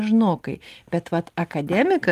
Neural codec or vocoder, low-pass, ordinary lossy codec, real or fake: none; 14.4 kHz; Opus, 24 kbps; real